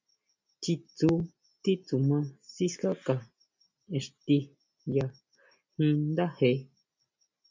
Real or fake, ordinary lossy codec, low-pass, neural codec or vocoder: real; MP3, 64 kbps; 7.2 kHz; none